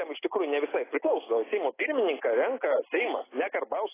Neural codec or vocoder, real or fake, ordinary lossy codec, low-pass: none; real; AAC, 16 kbps; 3.6 kHz